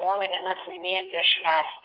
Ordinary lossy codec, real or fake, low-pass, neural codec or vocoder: Opus, 24 kbps; fake; 5.4 kHz; codec, 24 kHz, 1 kbps, SNAC